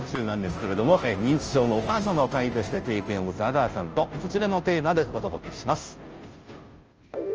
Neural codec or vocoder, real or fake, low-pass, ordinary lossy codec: codec, 16 kHz, 0.5 kbps, FunCodec, trained on Chinese and English, 25 frames a second; fake; 7.2 kHz; Opus, 24 kbps